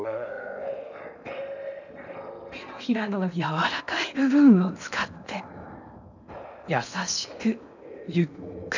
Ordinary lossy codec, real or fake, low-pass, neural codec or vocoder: none; fake; 7.2 kHz; codec, 16 kHz in and 24 kHz out, 0.8 kbps, FocalCodec, streaming, 65536 codes